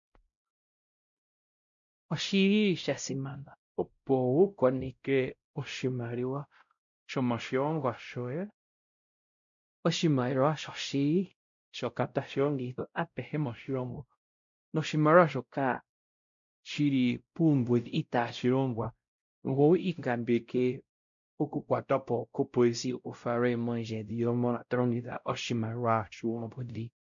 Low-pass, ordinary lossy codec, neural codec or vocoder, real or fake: 7.2 kHz; MP3, 64 kbps; codec, 16 kHz, 0.5 kbps, X-Codec, WavLM features, trained on Multilingual LibriSpeech; fake